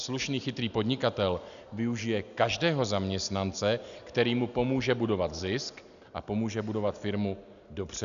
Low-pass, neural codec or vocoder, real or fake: 7.2 kHz; none; real